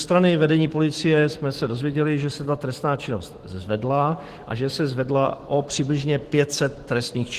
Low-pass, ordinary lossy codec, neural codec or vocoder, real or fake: 14.4 kHz; Opus, 16 kbps; autoencoder, 48 kHz, 128 numbers a frame, DAC-VAE, trained on Japanese speech; fake